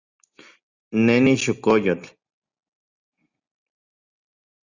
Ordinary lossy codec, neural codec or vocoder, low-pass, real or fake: Opus, 64 kbps; none; 7.2 kHz; real